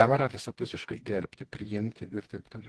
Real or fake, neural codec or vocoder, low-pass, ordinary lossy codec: fake; codec, 24 kHz, 0.9 kbps, WavTokenizer, medium music audio release; 10.8 kHz; Opus, 16 kbps